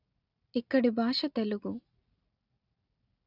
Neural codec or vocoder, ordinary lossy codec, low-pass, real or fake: none; none; 5.4 kHz; real